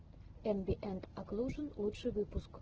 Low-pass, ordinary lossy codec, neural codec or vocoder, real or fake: 7.2 kHz; Opus, 16 kbps; none; real